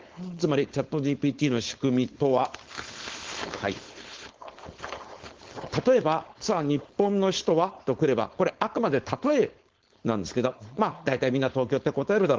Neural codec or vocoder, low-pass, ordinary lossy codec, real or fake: codec, 16 kHz, 4.8 kbps, FACodec; 7.2 kHz; Opus, 16 kbps; fake